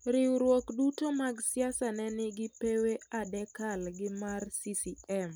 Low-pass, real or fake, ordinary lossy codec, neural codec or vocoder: none; real; none; none